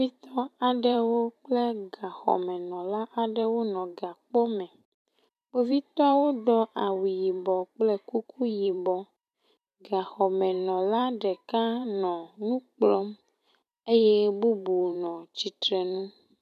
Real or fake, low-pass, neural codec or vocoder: fake; 14.4 kHz; vocoder, 44.1 kHz, 128 mel bands every 512 samples, BigVGAN v2